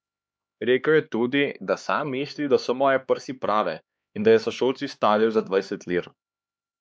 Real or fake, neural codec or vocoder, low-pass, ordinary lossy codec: fake; codec, 16 kHz, 2 kbps, X-Codec, HuBERT features, trained on LibriSpeech; none; none